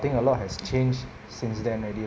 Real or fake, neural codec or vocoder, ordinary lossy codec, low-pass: real; none; none; none